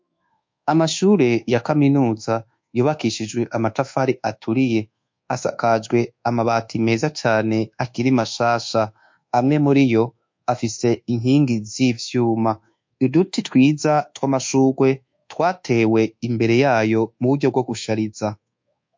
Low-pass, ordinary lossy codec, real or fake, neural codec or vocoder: 7.2 kHz; MP3, 48 kbps; fake; codec, 24 kHz, 1.2 kbps, DualCodec